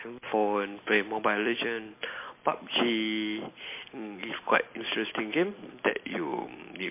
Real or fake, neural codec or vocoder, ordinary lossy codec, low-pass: real; none; MP3, 24 kbps; 3.6 kHz